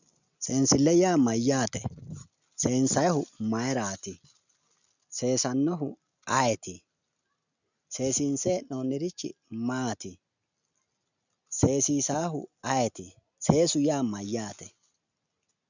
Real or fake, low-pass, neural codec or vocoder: real; 7.2 kHz; none